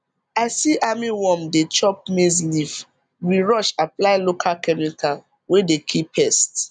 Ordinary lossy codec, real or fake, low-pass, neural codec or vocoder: none; real; 9.9 kHz; none